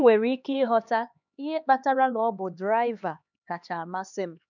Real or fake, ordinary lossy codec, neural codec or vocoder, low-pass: fake; none; codec, 16 kHz, 2 kbps, X-Codec, HuBERT features, trained on LibriSpeech; 7.2 kHz